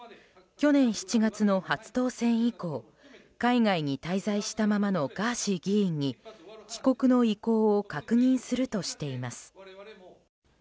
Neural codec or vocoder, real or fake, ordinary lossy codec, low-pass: none; real; none; none